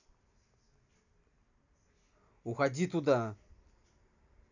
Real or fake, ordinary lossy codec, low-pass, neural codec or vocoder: real; none; 7.2 kHz; none